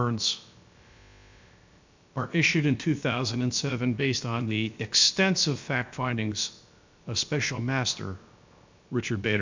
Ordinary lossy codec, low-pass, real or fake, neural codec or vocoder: MP3, 64 kbps; 7.2 kHz; fake; codec, 16 kHz, about 1 kbps, DyCAST, with the encoder's durations